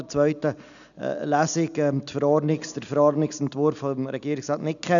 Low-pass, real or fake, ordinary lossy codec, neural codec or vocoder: 7.2 kHz; real; none; none